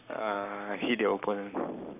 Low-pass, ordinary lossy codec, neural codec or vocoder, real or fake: 3.6 kHz; none; codec, 44.1 kHz, 7.8 kbps, Pupu-Codec; fake